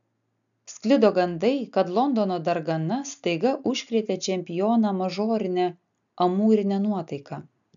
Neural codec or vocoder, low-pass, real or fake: none; 7.2 kHz; real